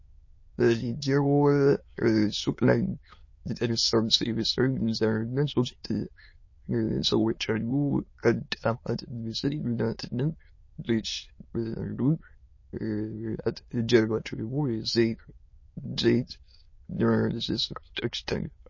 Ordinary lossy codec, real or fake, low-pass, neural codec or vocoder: MP3, 32 kbps; fake; 7.2 kHz; autoencoder, 22.05 kHz, a latent of 192 numbers a frame, VITS, trained on many speakers